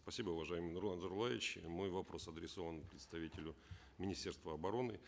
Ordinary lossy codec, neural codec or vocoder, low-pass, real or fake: none; none; none; real